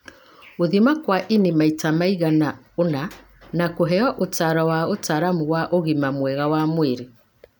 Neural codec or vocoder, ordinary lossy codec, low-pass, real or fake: none; none; none; real